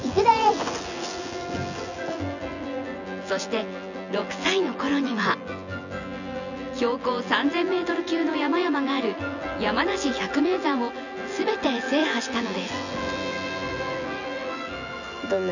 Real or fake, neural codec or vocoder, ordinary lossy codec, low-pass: fake; vocoder, 24 kHz, 100 mel bands, Vocos; none; 7.2 kHz